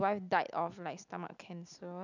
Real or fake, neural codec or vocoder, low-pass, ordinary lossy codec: real; none; 7.2 kHz; none